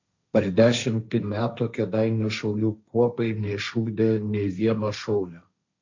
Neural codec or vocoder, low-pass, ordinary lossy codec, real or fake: codec, 16 kHz, 1.1 kbps, Voila-Tokenizer; 7.2 kHz; MP3, 64 kbps; fake